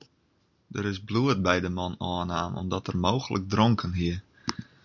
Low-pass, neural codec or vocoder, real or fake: 7.2 kHz; none; real